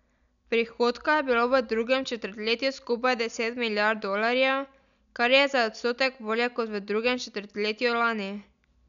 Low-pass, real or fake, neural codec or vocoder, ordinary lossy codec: 7.2 kHz; real; none; none